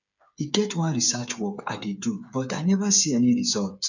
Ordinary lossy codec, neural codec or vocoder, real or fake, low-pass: none; codec, 16 kHz, 8 kbps, FreqCodec, smaller model; fake; 7.2 kHz